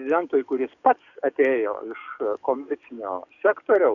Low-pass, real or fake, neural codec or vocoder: 7.2 kHz; fake; codec, 44.1 kHz, 7.8 kbps, DAC